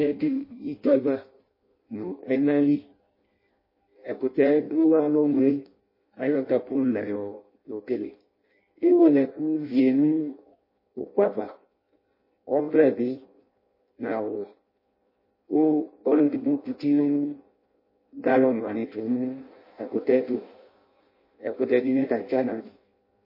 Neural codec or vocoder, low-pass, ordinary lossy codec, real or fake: codec, 16 kHz in and 24 kHz out, 0.6 kbps, FireRedTTS-2 codec; 5.4 kHz; MP3, 24 kbps; fake